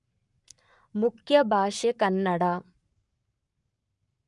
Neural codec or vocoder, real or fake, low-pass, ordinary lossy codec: codec, 44.1 kHz, 7.8 kbps, Pupu-Codec; fake; 10.8 kHz; none